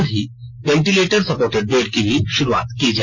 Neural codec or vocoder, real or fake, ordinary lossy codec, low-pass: none; real; none; 7.2 kHz